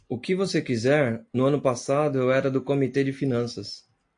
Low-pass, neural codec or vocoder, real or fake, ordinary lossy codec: 9.9 kHz; none; real; AAC, 48 kbps